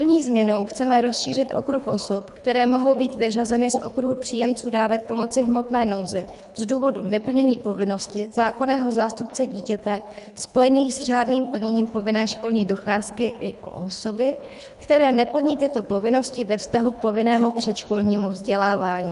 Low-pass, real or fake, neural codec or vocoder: 10.8 kHz; fake; codec, 24 kHz, 1.5 kbps, HILCodec